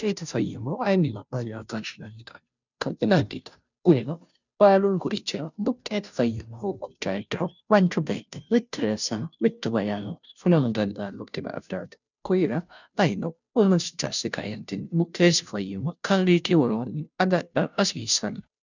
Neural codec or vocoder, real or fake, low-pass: codec, 16 kHz, 0.5 kbps, FunCodec, trained on Chinese and English, 25 frames a second; fake; 7.2 kHz